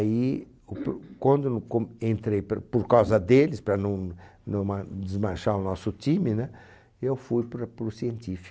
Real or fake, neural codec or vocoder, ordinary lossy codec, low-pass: real; none; none; none